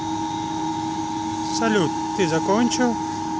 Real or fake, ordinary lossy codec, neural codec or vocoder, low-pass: real; none; none; none